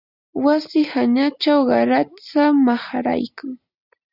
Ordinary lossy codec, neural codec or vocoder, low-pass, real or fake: Opus, 64 kbps; none; 5.4 kHz; real